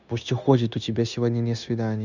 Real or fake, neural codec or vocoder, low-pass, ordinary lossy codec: fake; codec, 16 kHz, 0.9 kbps, LongCat-Audio-Codec; 7.2 kHz; none